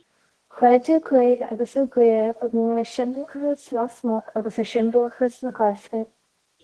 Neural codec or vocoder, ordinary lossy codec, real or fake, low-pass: codec, 24 kHz, 0.9 kbps, WavTokenizer, medium music audio release; Opus, 16 kbps; fake; 10.8 kHz